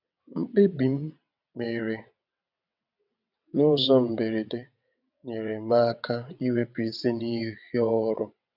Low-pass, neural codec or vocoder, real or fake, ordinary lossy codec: 5.4 kHz; vocoder, 22.05 kHz, 80 mel bands, WaveNeXt; fake; none